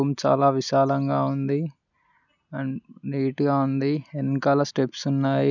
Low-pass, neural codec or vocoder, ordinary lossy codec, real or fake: 7.2 kHz; none; none; real